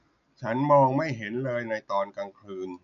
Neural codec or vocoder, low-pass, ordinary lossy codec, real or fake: none; 7.2 kHz; none; real